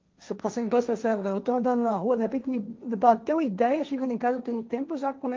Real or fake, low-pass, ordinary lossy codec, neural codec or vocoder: fake; 7.2 kHz; Opus, 24 kbps; codec, 16 kHz, 1.1 kbps, Voila-Tokenizer